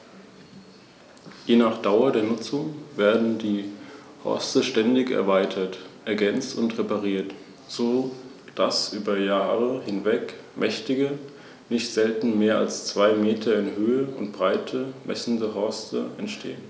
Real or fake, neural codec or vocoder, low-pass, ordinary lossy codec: real; none; none; none